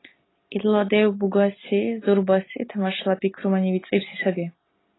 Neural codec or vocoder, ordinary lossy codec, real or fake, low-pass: none; AAC, 16 kbps; real; 7.2 kHz